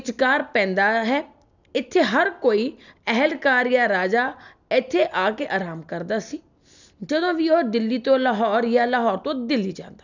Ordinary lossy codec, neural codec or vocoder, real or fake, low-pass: none; none; real; 7.2 kHz